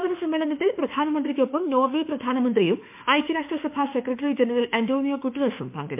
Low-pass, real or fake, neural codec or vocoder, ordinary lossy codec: 3.6 kHz; fake; autoencoder, 48 kHz, 32 numbers a frame, DAC-VAE, trained on Japanese speech; MP3, 32 kbps